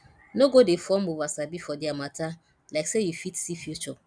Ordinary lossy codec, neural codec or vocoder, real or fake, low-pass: none; none; real; 9.9 kHz